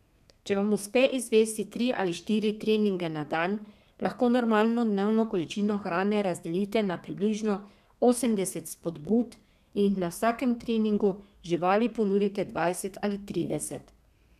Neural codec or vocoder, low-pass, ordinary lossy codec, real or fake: codec, 32 kHz, 1.9 kbps, SNAC; 14.4 kHz; none; fake